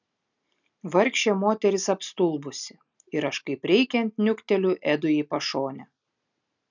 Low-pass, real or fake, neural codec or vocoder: 7.2 kHz; real; none